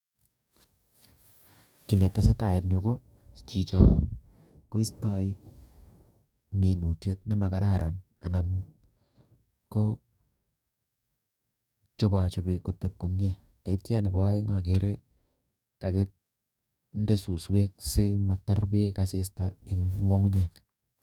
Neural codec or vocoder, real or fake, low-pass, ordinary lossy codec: codec, 44.1 kHz, 2.6 kbps, DAC; fake; 19.8 kHz; none